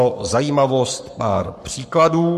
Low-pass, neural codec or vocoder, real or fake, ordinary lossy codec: 14.4 kHz; codec, 44.1 kHz, 7.8 kbps, Pupu-Codec; fake; MP3, 64 kbps